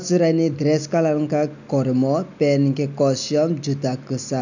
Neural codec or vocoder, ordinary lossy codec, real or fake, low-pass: none; none; real; 7.2 kHz